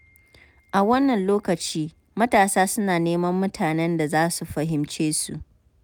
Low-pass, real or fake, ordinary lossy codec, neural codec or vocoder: none; real; none; none